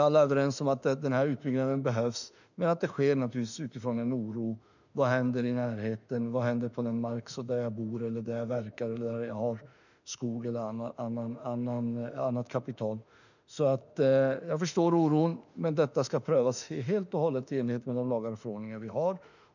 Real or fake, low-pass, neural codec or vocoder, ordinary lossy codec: fake; 7.2 kHz; autoencoder, 48 kHz, 32 numbers a frame, DAC-VAE, trained on Japanese speech; none